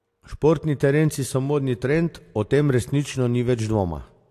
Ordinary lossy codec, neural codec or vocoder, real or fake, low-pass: AAC, 64 kbps; none; real; 14.4 kHz